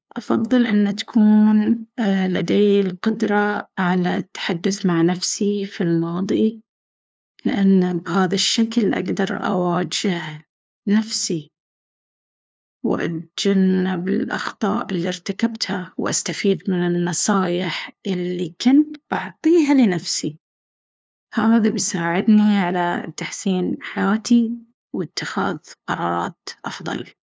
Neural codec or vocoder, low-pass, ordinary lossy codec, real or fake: codec, 16 kHz, 2 kbps, FunCodec, trained on LibriTTS, 25 frames a second; none; none; fake